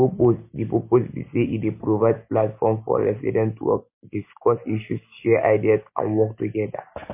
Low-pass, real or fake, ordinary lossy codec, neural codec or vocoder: 3.6 kHz; real; MP3, 24 kbps; none